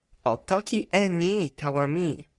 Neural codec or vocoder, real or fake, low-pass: codec, 44.1 kHz, 3.4 kbps, Pupu-Codec; fake; 10.8 kHz